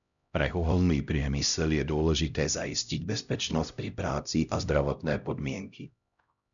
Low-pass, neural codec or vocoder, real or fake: 7.2 kHz; codec, 16 kHz, 0.5 kbps, X-Codec, HuBERT features, trained on LibriSpeech; fake